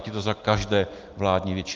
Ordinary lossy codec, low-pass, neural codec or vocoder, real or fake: Opus, 32 kbps; 7.2 kHz; none; real